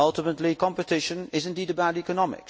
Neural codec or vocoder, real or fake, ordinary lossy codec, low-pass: none; real; none; none